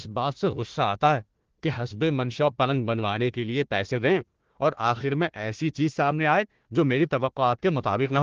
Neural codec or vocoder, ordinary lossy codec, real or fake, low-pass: codec, 16 kHz, 1 kbps, FunCodec, trained on Chinese and English, 50 frames a second; Opus, 16 kbps; fake; 7.2 kHz